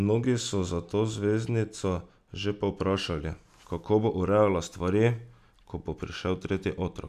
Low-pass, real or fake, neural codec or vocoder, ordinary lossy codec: 14.4 kHz; real; none; none